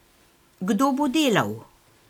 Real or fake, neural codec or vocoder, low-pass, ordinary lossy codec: real; none; 19.8 kHz; none